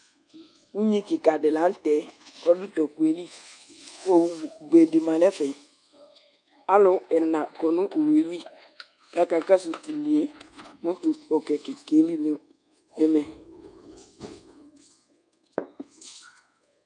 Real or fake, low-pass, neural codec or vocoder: fake; 10.8 kHz; codec, 24 kHz, 1.2 kbps, DualCodec